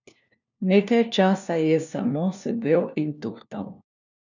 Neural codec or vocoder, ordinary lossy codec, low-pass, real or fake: codec, 16 kHz, 1 kbps, FunCodec, trained on LibriTTS, 50 frames a second; none; 7.2 kHz; fake